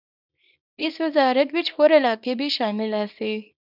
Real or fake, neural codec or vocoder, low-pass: fake; codec, 24 kHz, 0.9 kbps, WavTokenizer, small release; 5.4 kHz